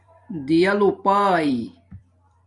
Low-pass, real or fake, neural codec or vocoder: 10.8 kHz; fake; vocoder, 44.1 kHz, 128 mel bands every 256 samples, BigVGAN v2